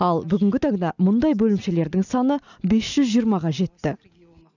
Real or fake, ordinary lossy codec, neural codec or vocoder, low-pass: real; none; none; 7.2 kHz